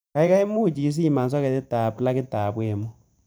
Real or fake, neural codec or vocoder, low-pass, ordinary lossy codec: fake; vocoder, 44.1 kHz, 128 mel bands every 512 samples, BigVGAN v2; none; none